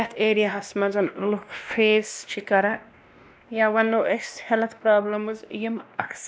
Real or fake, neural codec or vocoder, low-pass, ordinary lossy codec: fake; codec, 16 kHz, 1 kbps, X-Codec, WavLM features, trained on Multilingual LibriSpeech; none; none